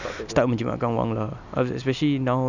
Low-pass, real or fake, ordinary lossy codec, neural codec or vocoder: 7.2 kHz; real; none; none